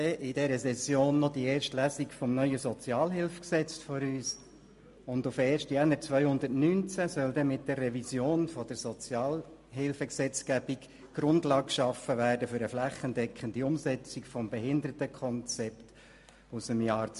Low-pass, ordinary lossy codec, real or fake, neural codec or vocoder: 14.4 kHz; MP3, 48 kbps; real; none